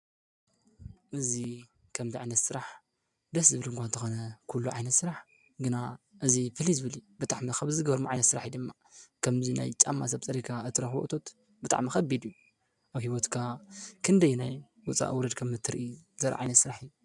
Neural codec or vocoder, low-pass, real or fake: none; 10.8 kHz; real